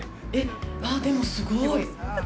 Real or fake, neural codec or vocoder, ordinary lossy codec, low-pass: real; none; none; none